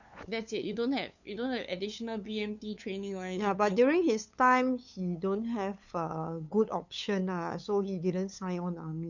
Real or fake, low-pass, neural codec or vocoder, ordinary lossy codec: fake; 7.2 kHz; codec, 16 kHz, 8 kbps, FunCodec, trained on LibriTTS, 25 frames a second; none